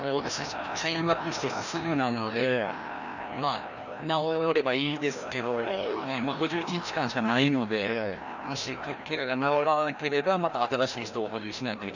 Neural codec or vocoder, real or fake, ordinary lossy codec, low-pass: codec, 16 kHz, 1 kbps, FreqCodec, larger model; fake; none; 7.2 kHz